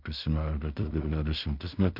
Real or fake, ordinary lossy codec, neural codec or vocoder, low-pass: fake; MP3, 48 kbps; codec, 16 kHz in and 24 kHz out, 0.4 kbps, LongCat-Audio-Codec, two codebook decoder; 5.4 kHz